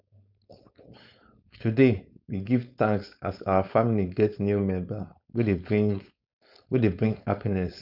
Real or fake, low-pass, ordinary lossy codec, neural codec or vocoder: fake; 5.4 kHz; none; codec, 16 kHz, 4.8 kbps, FACodec